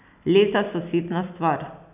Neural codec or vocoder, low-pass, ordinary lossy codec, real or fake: none; 3.6 kHz; none; real